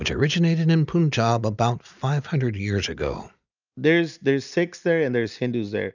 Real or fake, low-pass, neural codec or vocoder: real; 7.2 kHz; none